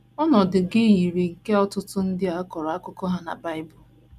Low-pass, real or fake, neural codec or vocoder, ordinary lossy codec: 14.4 kHz; real; none; none